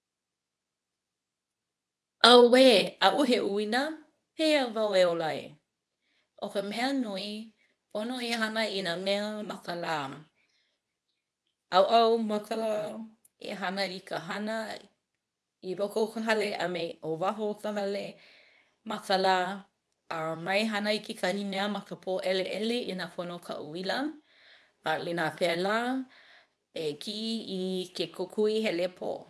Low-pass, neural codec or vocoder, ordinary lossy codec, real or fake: none; codec, 24 kHz, 0.9 kbps, WavTokenizer, medium speech release version 2; none; fake